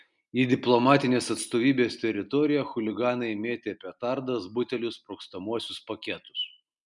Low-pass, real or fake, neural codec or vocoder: 10.8 kHz; real; none